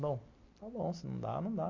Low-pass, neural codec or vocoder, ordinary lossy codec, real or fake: 7.2 kHz; none; MP3, 48 kbps; real